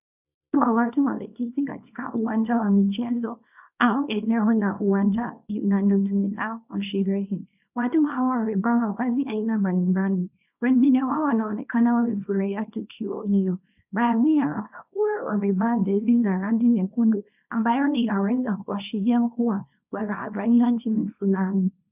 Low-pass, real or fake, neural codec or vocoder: 3.6 kHz; fake; codec, 24 kHz, 0.9 kbps, WavTokenizer, small release